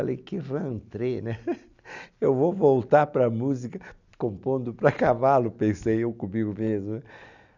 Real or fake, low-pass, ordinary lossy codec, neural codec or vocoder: real; 7.2 kHz; none; none